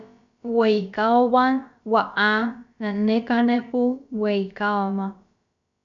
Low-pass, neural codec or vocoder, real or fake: 7.2 kHz; codec, 16 kHz, about 1 kbps, DyCAST, with the encoder's durations; fake